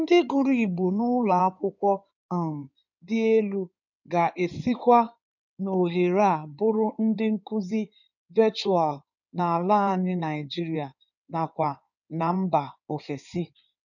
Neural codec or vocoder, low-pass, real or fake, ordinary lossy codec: codec, 16 kHz in and 24 kHz out, 2.2 kbps, FireRedTTS-2 codec; 7.2 kHz; fake; none